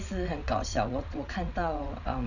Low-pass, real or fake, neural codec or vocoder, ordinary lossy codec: 7.2 kHz; fake; vocoder, 22.05 kHz, 80 mel bands, WaveNeXt; none